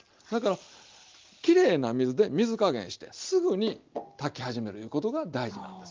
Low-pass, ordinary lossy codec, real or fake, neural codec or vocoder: 7.2 kHz; Opus, 32 kbps; real; none